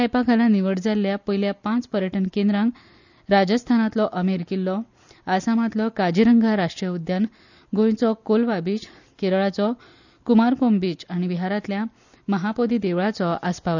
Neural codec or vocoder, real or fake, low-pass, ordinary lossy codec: none; real; 7.2 kHz; none